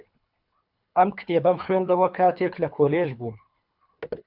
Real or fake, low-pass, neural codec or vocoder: fake; 5.4 kHz; codec, 24 kHz, 3 kbps, HILCodec